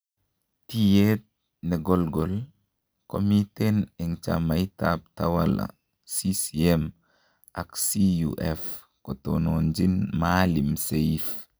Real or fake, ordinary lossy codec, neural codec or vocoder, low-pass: real; none; none; none